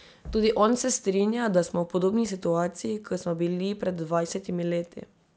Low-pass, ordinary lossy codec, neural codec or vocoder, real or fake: none; none; none; real